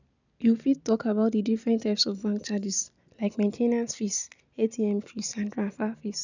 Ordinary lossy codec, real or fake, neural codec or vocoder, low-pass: none; real; none; 7.2 kHz